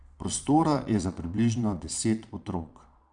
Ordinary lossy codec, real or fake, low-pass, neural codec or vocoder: none; fake; 9.9 kHz; vocoder, 22.05 kHz, 80 mel bands, Vocos